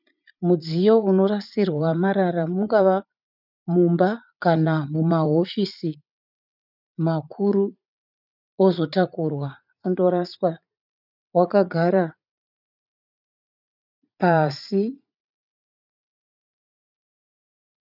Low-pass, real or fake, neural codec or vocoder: 5.4 kHz; fake; vocoder, 44.1 kHz, 80 mel bands, Vocos